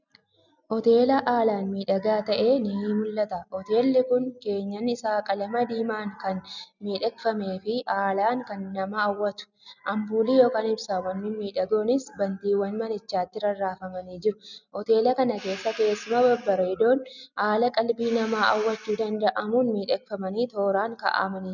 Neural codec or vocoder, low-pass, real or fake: none; 7.2 kHz; real